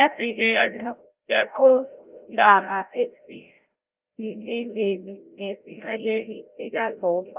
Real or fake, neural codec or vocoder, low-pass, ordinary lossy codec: fake; codec, 16 kHz, 0.5 kbps, FreqCodec, larger model; 3.6 kHz; Opus, 32 kbps